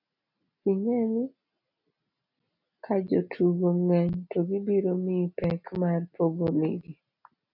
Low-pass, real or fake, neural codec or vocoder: 5.4 kHz; real; none